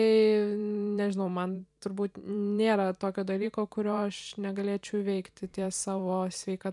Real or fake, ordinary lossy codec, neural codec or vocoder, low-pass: fake; MP3, 96 kbps; vocoder, 44.1 kHz, 128 mel bands every 512 samples, BigVGAN v2; 10.8 kHz